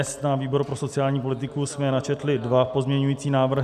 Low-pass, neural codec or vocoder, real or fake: 14.4 kHz; none; real